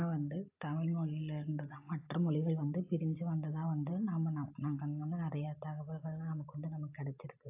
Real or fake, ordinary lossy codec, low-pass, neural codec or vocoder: real; AAC, 32 kbps; 3.6 kHz; none